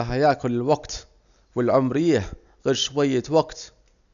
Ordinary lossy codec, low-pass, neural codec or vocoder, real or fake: none; 7.2 kHz; none; real